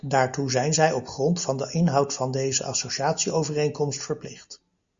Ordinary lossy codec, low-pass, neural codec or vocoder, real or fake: Opus, 64 kbps; 7.2 kHz; none; real